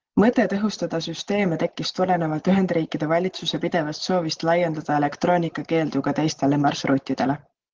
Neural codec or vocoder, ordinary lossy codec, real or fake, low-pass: none; Opus, 16 kbps; real; 7.2 kHz